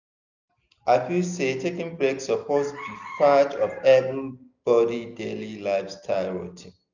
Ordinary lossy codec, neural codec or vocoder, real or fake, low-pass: none; none; real; 7.2 kHz